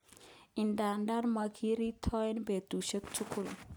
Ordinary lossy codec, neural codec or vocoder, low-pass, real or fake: none; none; none; real